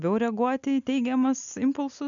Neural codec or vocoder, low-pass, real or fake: none; 7.2 kHz; real